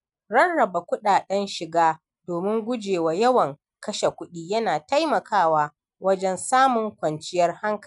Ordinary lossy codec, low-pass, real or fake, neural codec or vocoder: none; 10.8 kHz; real; none